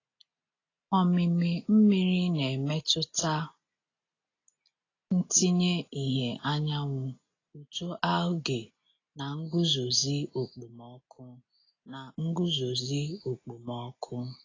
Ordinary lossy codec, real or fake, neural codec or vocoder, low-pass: AAC, 32 kbps; real; none; 7.2 kHz